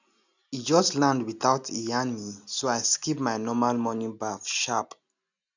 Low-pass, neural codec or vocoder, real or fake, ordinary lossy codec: 7.2 kHz; none; real; none